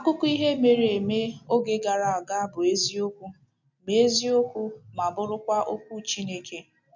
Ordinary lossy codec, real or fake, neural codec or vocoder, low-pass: none; real; none; 7.2 kHz